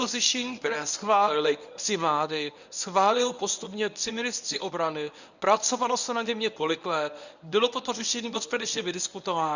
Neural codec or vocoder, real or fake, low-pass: codec, 24 kHz, 0.9 kbps, WavTokenizer, medium speech release version 1; fake; 7.2 kHz